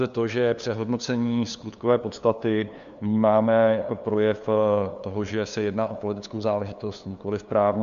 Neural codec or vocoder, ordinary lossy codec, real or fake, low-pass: codec, 16 kHz, 2 kbps, FunCodec, trained on LibriTTS, 25 frames a second; Opus, 64 kbps; fake; 7.2 kHz